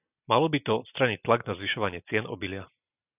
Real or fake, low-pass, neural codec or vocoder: real; 3.6 kHz; none